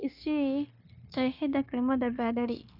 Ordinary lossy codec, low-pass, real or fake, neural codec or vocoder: none; 5.4 kHz; fake; codec, 16 kHz, 0.9 kbps, LongCat-Audio-Codec